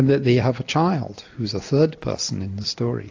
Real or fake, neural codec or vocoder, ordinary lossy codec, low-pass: real; none; AAC, 48 kbps; 7.2 kHz